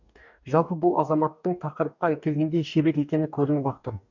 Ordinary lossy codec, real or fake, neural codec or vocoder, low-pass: none; fake; codec, 44.1 kHz, 2.6 kbps, DAC; 7.2 kHz